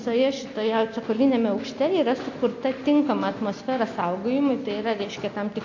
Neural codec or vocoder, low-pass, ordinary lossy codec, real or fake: none; 7.2 kHz; AAC, 48 kbps; real